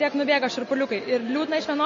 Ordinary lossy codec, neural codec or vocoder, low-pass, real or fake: MP3, 32 kbps; none; 7.2 kHz; real